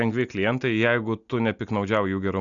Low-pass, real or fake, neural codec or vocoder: 7.2 kHz; real; none